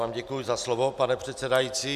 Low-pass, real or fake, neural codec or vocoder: 14.4 kHz; real; none